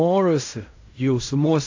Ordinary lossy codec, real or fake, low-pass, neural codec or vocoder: AAC, 48 kbps; fake; 7.2 kHz; codec, 16 kHz in and 24 kHz out, 0.4 kbps, LongCat-Audio-Codec, fine tuned four codebook decoder